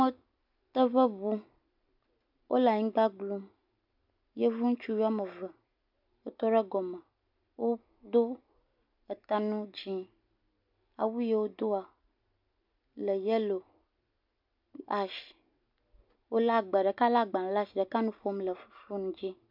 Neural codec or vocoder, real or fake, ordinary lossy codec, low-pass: none; real; MP3, 48 kbps; 5.4 kHz